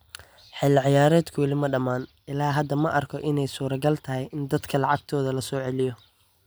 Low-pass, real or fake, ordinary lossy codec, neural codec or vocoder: none; real; none; none